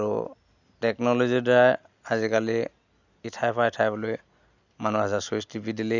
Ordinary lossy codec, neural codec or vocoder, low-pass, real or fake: none; none; 7.2 kHz; real